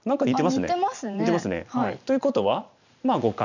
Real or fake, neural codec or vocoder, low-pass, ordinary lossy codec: fake; vocoder, 44.1 kHz, 128 mel bands every 512 samples, BigVGAN v2; 7.2 kHz; none